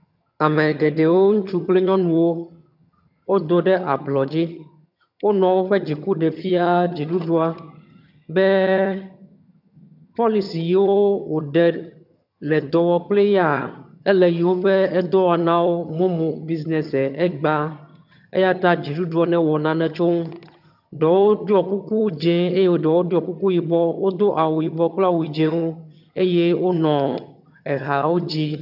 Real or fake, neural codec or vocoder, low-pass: fake; vocoder, 22.05 kHz, 80 mel bands, HiFi-GAN; 5.4 kHz